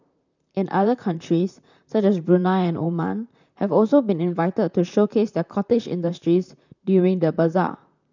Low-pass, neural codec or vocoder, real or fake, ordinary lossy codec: 7.2 kHz; vocoder, 44.1 kHz, 128 mel bands, Pupu-Vocoder; fake; none